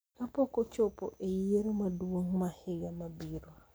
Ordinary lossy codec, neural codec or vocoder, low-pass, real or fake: none; none; none; real